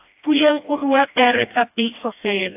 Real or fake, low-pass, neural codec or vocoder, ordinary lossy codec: fake; 3.6 kHz; codec, 16 kHz, 1 kbps, FreqCodec, smaller model; AAC, 32 kbps